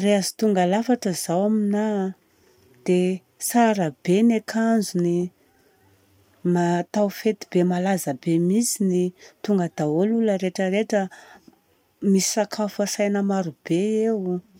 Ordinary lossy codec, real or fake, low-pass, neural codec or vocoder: none; real; 19.8 kHz; none